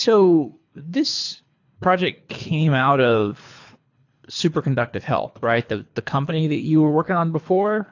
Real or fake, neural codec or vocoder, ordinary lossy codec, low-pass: fake; codec, 24 kHz, 3 kbps, HILCodec; AAC, 48 kbps; 7.2 kHz